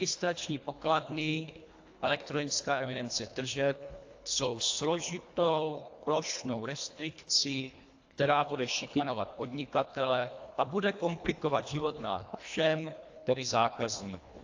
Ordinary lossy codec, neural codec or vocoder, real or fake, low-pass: AAC, 48 kbps; codec, 24 kHz, 1.5 kbps, HILCodec; fake; 7.2 kHz